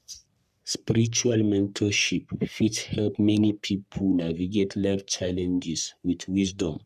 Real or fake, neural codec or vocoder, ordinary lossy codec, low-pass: fake; codec, 44.1 kHz, 3.4 kbps, Pupu-Codec; none; 14.4 kHz